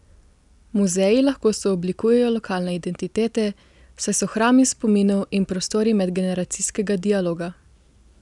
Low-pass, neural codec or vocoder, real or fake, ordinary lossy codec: 10.8 kHz; none; real; none